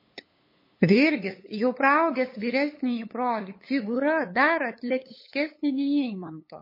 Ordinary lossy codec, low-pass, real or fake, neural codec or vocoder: MP3, 24 kbps; 5.4 kHz; fake; codec, 16 kHz, 8 kbps, FunCodec, trained on LibriTTS, 25 frames a second